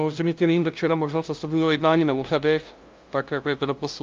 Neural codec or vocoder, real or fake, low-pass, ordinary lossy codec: codec, 16 kHz, 0.5 kbps, FunCodec, trained on LibriTTS, 25 frames a second; fake; 7.2 kHz; Opus, 24 kbps